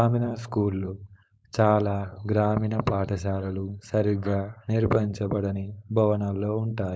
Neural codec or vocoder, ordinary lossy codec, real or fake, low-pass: codec, 16 kHz, 4.8 kbps, FACodec; none; fake; none